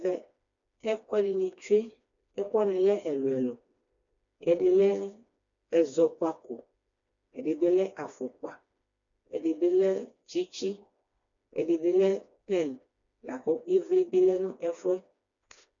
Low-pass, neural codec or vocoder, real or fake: 7.2 kHz; codec, 16 kHz, 2 kbps, FreqCodec, smaller model; fake